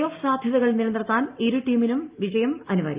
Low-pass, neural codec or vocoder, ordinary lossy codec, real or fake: 3.6 kHz; none; Opus, 24 kbps; real